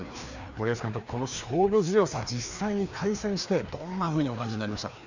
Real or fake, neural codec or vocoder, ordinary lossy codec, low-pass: fake; codec, 16 kHz, 2 kbps, FreqCodec, larger model; none; 7.2 kHz